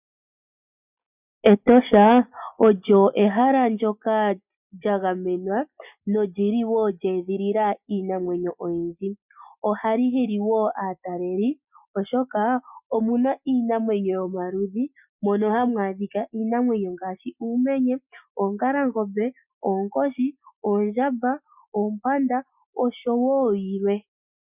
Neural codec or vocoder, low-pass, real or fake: none; 3.6 kHz; real